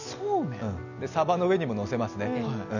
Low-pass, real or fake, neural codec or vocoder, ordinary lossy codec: 7.2 kHz; real; none; none